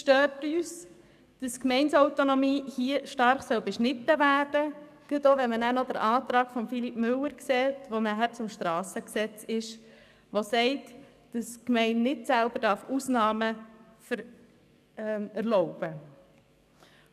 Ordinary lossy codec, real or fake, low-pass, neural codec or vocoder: none; fake; 14.4 kHz; codec, 44.1 kHz, 7.8 kbps, DAC